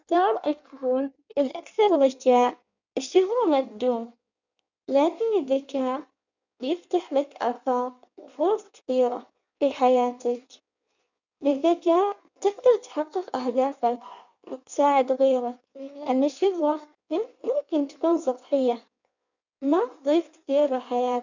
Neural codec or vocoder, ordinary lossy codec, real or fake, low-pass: codec, 16 kHz in and 24 kHz out, 1.1 kbps, FireRedTTS-2 codec; none; fake; 7.2 kHz